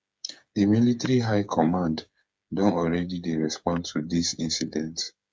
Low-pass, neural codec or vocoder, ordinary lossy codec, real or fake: none; codec, 16 kHz, 8 kbps, FreqCodec, smaller model; none; fake